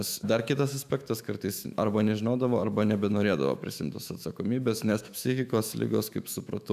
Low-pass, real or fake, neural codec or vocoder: 14.4 kHz; fake; autoencoder, 48 kHz, 128 numbers a frame, DAC-VAE, trained on Japanese speech